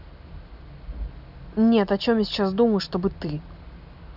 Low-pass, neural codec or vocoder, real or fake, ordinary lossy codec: 5.4 kHz; none; real; none